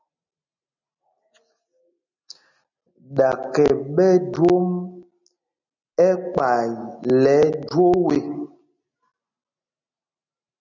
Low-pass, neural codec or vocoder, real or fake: 7.2 kHz; none; real